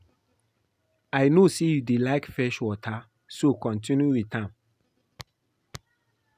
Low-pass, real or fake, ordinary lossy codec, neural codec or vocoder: 14.4 kHz; real; none; none